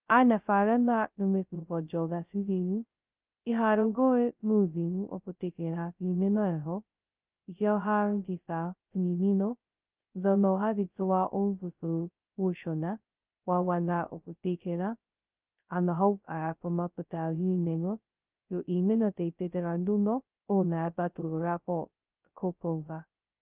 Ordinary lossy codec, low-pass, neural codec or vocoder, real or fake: Opus, 32 kbps; 3.6 kHz; codec, 16 kHz, 0.2 kbps, FocalCodec; fake